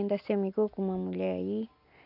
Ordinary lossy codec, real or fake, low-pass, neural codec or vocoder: none; real; 5.4 kHz; none